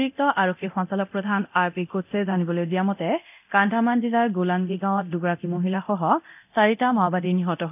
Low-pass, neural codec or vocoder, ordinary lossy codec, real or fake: 3.6 kHz; codec, 24 kHz, 0.9 kbps, DualCodec; none; fake